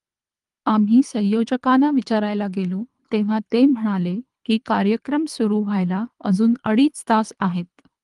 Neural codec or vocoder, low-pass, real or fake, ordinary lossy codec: codec, 24 kHz, 3 kbps, HILCodec; 10.8 kHz; fake; Opus, 32 kbps